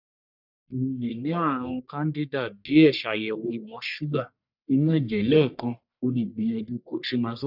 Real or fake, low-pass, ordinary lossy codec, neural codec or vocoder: fake; 5.4 kHz; none; codec, 44.1 kHz, 1.7 kbps, Pupu-Codec